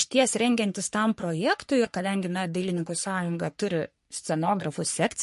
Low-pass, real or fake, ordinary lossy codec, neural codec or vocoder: 14.4 kHz; fake; MP3, 48 kbps; codec, 44.1 kHz, 3.4 kbps, Pupu-Codec